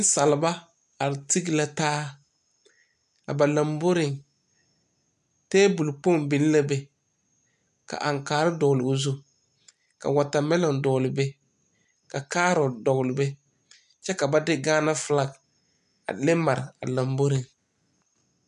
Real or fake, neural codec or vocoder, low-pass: real; none; 10.8 kHz